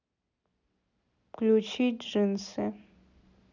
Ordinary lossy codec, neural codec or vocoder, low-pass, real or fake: none; none; 7.2 kHz; real